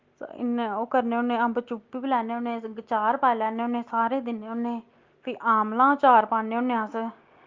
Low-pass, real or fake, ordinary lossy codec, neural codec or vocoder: 7.2 kHz; real; Opus, 32 kbps; none